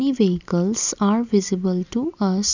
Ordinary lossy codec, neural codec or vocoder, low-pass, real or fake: none; none; 7.2 kHz; real